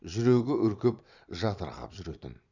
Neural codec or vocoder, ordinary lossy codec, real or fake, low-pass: vocoder, 22.05 kHz, 80 mel bands, Vocos; none; fake; 7.2 kHz